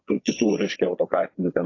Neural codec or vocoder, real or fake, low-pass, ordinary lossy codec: vocoder, 22.05 kHz, 80 mel bands, Vocos; fake; 7.2 kHz; AAC, 32 kbps